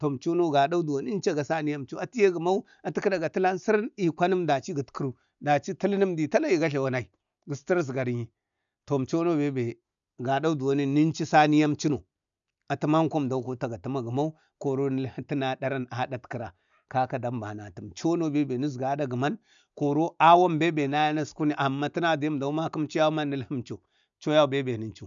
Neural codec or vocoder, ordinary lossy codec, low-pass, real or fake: none; none; 7.2 kHz; real